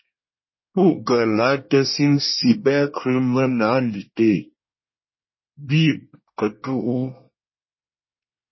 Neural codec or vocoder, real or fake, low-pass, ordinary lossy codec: codec, 24 kHz, 1 kbps, SNAC; fake; 7.2 kHz; MP3, 24 kbps